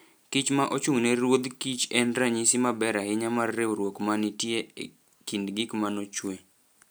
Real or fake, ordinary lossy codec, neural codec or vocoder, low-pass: real; none; none; none